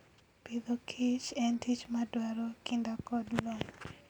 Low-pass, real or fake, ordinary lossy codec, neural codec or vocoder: 19.8 kHz; real; none; none